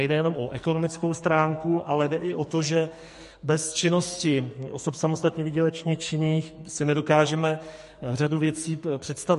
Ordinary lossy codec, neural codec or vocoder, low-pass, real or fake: MP3, 48 kbps; codec, 44.1 kHz, 2.6 kbps, SNAC; 14.4 kHz; fake